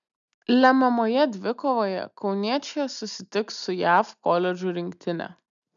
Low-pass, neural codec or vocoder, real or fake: 7.2 kHz; none; real